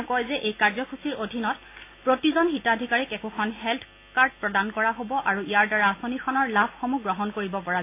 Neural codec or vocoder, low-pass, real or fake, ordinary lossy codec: none; 3.6 kHz; real; AAC, 24 kbps